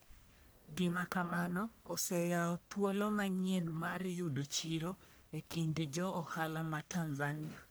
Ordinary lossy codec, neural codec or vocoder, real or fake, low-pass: none; codec, 44.1 kHz, 1.7 kbps, Pupu-Codec; fake; none